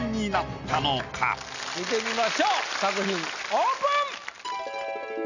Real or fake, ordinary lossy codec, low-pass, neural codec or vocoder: real; none; 7.2 kHz; none